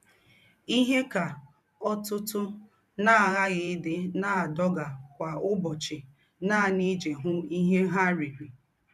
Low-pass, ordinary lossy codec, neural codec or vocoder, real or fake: 14.4 kHz; none; vocoder, 44.1 kHz, 128 mel bands every 512 samples, BigVGAN v2; fake